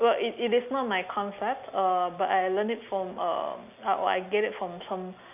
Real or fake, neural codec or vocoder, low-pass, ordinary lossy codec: real; none; 3.6 kHz; AAC, 32 kbps